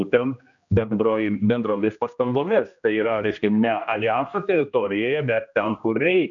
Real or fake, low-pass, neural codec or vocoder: fake; 7.2 kHz; codec, 16 kHz, 1 kbps, X-Codec, HuBERT features, trained on general audio